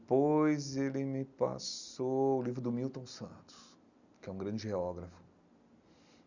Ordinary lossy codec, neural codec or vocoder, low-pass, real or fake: none; none; 7.2 kHz; real